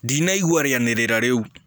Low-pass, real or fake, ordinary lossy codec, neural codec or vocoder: none; real; none; none